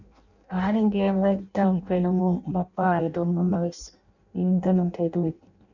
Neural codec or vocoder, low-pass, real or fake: codec, 16 kHz in and 24 kHz out, 0.6 kbps, FireRedTTS-2 codec; 7.2 kHz; fake